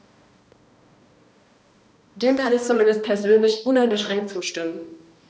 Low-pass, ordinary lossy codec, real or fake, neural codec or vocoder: none; none; fake; codec, 16 kHz, 1 kbps, X-Codec, HuBERT features, trained on balanced general audio